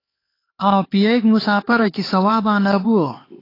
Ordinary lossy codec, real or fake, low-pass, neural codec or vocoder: AAC, 24 kbps; fake; 5.4 kHz; codec, 16 kHz, 4 kbps, X-Codec, HuBERT features, trained on LibriSpeech